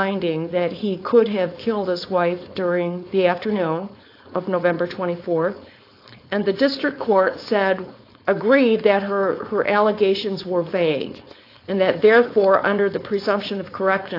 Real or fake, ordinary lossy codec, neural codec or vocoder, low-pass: fake; AAC, 32 kbps; codec, 16 kHz, 4.8 kbps, FACodec; 5.4 kHz